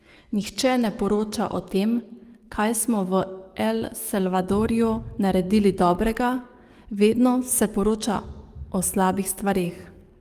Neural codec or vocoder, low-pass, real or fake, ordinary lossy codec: codec, 44.1 kHz, 7.8 kbps, DAC; 14.4 kHz; fake; Opus, 32 kbps